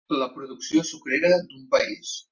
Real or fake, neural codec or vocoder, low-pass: real; none; 7.2 kHz